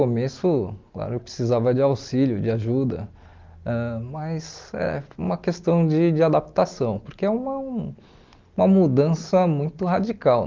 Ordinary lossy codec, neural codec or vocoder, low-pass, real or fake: Opus, 32 kbps; none; 7.2 kHz; real